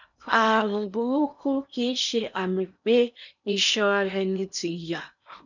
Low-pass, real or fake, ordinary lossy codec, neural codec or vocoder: 7.2 kHz; fake; none; codec, 16 kHz in and 24 kHz out, 0.8 kbps, FocalCodec, streaming, 65536 codes